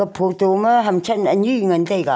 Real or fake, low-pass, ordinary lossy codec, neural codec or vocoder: real; none; none; none